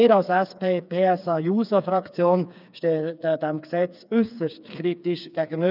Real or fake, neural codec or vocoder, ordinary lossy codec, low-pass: fake; codec, 16 kHz, 4 kbps, FreqCodec, smaller model; none; 5.4 kHz